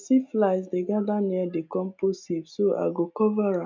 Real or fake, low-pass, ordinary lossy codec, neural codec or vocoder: real; 7.2 kHz; MP3, 64 kbps; none